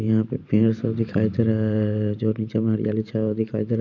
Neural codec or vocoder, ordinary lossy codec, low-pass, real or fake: vocoder, 44.1 kHz, 128 mel bands every 512 samples, BigVGAN v2; none; 7.2 kHz; fake